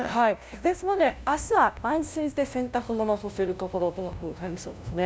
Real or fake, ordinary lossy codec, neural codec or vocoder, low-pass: fake; none; codec, 16 kHz, 0.5 kbps, FunCodec, trained on LibriTTS, 25 frames a second; none